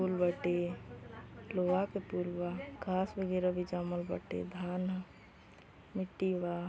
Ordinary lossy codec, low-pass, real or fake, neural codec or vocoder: none; none; real; none